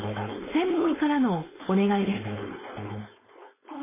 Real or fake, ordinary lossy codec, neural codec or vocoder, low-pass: fake; MP3, 16 kbps; codec, 16 kHz, 4.8 kbps, FACodec; 3.6 kHz